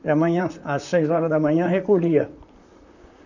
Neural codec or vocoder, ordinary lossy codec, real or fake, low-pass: vocoder, 44.1 kHz, 128 mel bands, Pupu-Vocoder; none; fake; 7.2 kHz